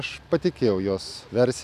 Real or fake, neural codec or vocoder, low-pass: real; none; 14.4 kHz